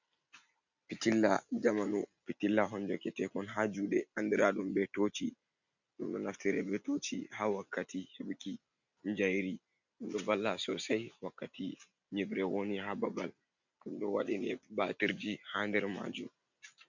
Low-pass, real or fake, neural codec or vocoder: 7.2 kHz; real; none